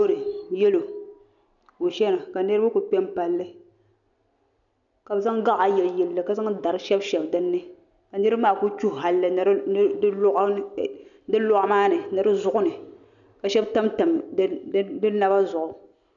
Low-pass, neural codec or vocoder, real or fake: 7.2 kHz; none; real